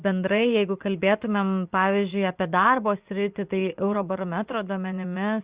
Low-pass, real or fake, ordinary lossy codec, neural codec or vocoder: 3.6 kHz; fake; Opus, 64 kbps; vocoder, 24 kHz, 100 mel bands, Vocos